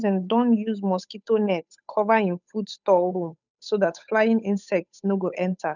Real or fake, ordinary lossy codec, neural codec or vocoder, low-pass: fake; none; codec, 16 kHz, 8 kbps, FunCodec, trained on Chinese and English, 25 frames a second; 7.2 kHz